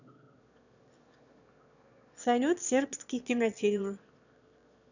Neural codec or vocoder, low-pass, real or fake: autoencoder, 22.05 kHz, a latent of 192 numbers a frame, VITS, trained on one speaker; 7.2 kHz; fake